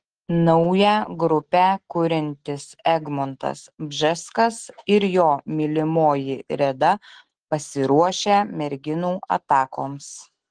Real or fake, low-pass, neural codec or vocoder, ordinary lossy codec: real; 9.9 kHz; none; Opus, 16 kbps